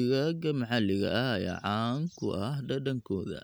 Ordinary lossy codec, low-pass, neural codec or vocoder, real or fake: none; none; none; real